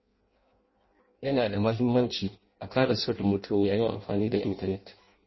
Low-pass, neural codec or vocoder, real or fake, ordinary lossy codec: 7.2 kHz; codec, 16 kHz in and 24 kHz out, 0.6 kbps, FireRedTTS-2 codec; fake; MP3, 24 kbps